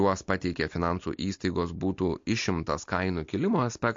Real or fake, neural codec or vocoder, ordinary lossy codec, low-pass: real; none; MP3, 48 kbps; 7.2 kHz